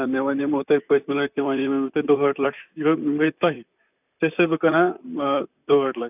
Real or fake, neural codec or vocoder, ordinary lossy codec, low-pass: fake; vocoder, 44.1 kHz, 128 mel bands, Pupu-Vocoder; none; 3.6 kHz